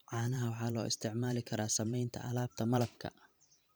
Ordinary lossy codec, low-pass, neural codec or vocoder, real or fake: none; none; vocoder, 44.1 kHz, 128 mel bands every 512 samples, BigVGAN v2; fake